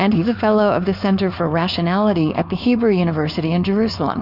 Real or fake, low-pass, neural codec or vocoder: fake; 5.4 kHz; codec, 16 kHz, 4.8 kbps, FACodec